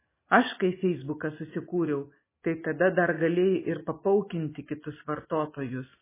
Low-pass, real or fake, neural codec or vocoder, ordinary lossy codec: 3.6 kHz; real; none; MP3, 16 kbps